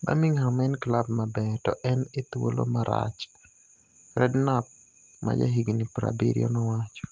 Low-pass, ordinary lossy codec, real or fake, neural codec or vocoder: 7.2 kHz; Opus, 24 kbps; real; none